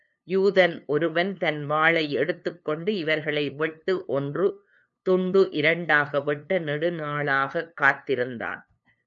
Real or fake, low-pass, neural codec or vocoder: fake; 7.2 kHz; codec, 16 kHz, 2 kbps, FunCodec, trained on LibriTTS, 25 frames a second